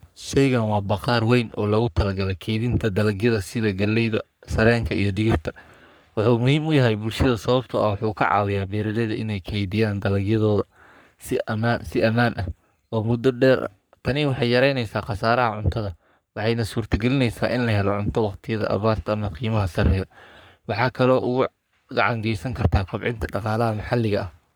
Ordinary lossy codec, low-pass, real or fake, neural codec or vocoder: none; none; fake; codec, 44.1 kHz, 3.4 kbps, Pupu-Codec